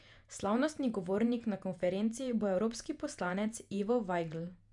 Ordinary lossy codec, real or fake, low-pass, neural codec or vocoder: none; fake; 10.8 kHz; vocoder, 48 kHz, 128 mel bands, Vocos